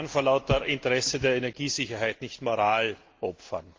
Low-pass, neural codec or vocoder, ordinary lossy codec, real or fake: 7.2 kHz; none; Opus, 32 kbps; real